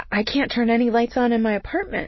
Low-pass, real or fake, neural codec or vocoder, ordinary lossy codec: 7.2 kHz; real; none; MP3, 24 kbps